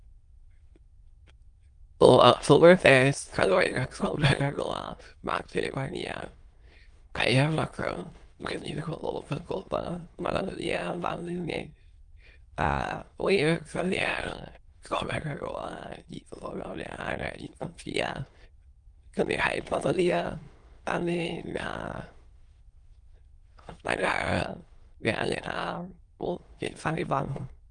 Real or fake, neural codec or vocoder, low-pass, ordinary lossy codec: fake; autoencoder, 22.05 kHz, a latent of 192 numbers a frame, VITS, trained on many speakers; 9.9 kHz; Opus, 32 kbps